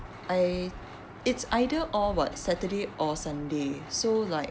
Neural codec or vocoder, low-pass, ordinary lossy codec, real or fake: none; none; none; real